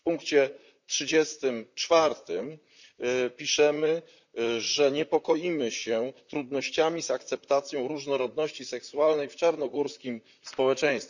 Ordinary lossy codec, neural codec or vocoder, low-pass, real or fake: none; vocoder, 44.1 kHz, 128 mel bands, Pupu-Vocoder; 7.2 kHz; fake